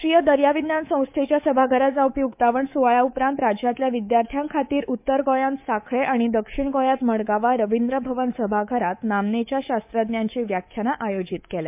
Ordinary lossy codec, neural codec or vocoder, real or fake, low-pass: MP3, 32 kbps; codec, 24 kHz, 3.1 kbps, DualCodec; fake; 3.6 kHz